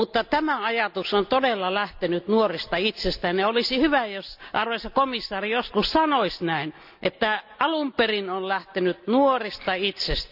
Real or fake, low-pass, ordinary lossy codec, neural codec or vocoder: real; 5.4 kHz; none; none